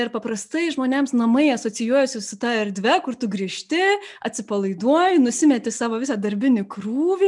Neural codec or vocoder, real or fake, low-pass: none; real; 10.8 kHz